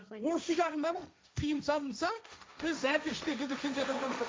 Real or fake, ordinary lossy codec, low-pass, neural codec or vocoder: fake; none; none; codec, 16 kHz, 1.1 kbps, Voila-Tokenizer